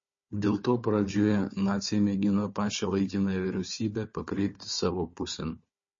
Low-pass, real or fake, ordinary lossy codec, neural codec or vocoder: 7.2 kHz; fake; MP3, 32 kbps; codec, 16 kHz, 4 kbps, FunCodec, trained on Chinese and English, 50 frames a second